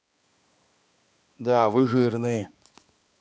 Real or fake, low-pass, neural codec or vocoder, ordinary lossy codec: fake; none; codec, 16 kHz, 2 kbps, X-Codec, HuBERT features, trained on balanced general audio; none